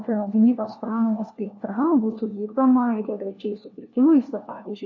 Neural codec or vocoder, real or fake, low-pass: codec, 16 kHz, 1 kbps, FunCodec, trained on LibriTTS, 50 frames a second; fake; 7.2 kHz